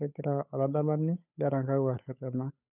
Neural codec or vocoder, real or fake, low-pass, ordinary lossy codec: codec, 16 kHz, 8 kbps, FunCodec, trained on Chinese and English, 25 frames a second; fake; 3.6 kHz; none